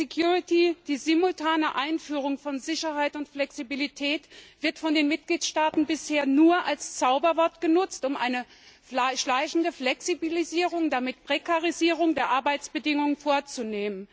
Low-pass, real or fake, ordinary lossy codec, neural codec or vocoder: none; real; none; none